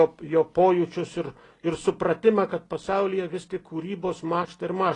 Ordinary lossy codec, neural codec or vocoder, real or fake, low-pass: AAC, 32 kbps; none; real; 10.8 kHz